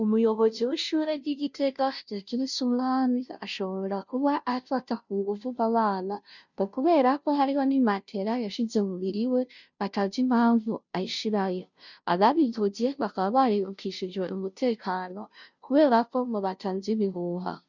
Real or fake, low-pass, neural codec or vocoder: fake; 7.2 kHz; codec, 16 kHz, 0.5 kbps, FunCodec, trained on Chinese and English, 25 frames a second